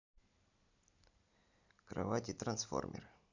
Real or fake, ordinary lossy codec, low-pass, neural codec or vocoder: real; none; 7.2 kHz; none